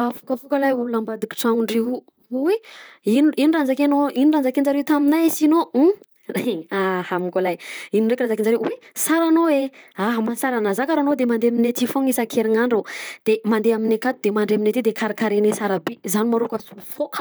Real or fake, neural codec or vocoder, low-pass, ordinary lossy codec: fake; vocoder, 44.1 kHz, 128 mel bands, Pupu-Vocoder; none; none